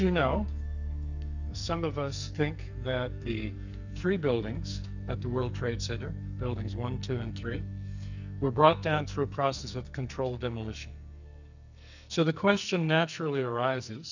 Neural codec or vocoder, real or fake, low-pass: codec, 44.1 kHz, 2.6 kbps, SNAC; fake; 7.2 kHz